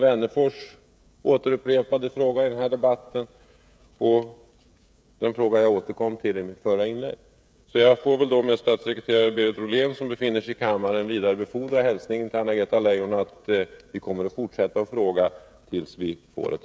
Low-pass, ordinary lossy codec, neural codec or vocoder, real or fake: none; none; codec, 16 kHz, 16 kbps, FreqCodec, smaller model; fake